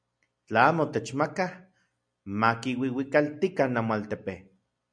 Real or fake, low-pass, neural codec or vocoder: real; 9.9 kHz; none